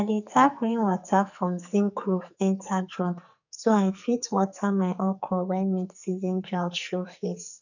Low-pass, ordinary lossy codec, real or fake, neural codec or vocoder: 7.2 kHz; none; fake; codec, 44.1 kHz, 2.6 kbps, SNAC